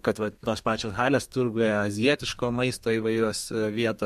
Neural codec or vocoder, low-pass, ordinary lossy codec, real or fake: codec, 32 kHz, 1.9 kbps, SNAC; 14.4 kHz; MP3, 64 kbps; fake